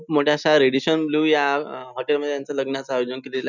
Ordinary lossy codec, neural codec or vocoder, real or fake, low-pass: none; none; real; 7.2 kHz